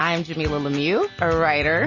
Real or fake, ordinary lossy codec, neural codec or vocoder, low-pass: real; MP3, 32 kbps; none; 7.2 kHz